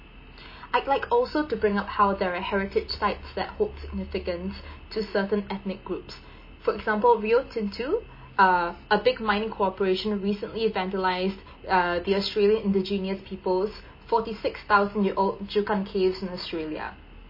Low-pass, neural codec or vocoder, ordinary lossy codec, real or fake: 5.4 kHz; none; MP3, 24 kbps; real